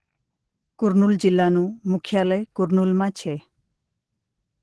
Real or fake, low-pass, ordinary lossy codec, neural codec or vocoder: fake; 10.8 kHz; Opus, 16 kbps; codec, 44.1 kHz, 7.8 kbps, DAC